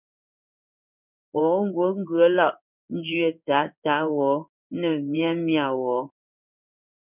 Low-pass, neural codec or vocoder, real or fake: 3.6 kHz; codec, 16 kHz in and 24 kHz out, 1 kbps, XY-Tokenizer; fake